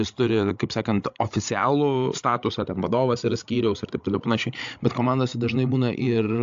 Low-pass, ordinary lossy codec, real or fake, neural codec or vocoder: 7.2 kHz; AAC, 64 kbps; fake; codec, 16 kHz, 16 kbps, FreqCodec, larger model